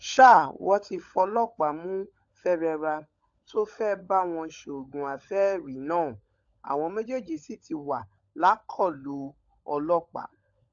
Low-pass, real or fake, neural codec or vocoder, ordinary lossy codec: 7.2 kHz; fake; codec, 16 kHz, 16 kbps, FunCodec, trained on LibriTTS, 50 frames a second; AAC, 64 kbps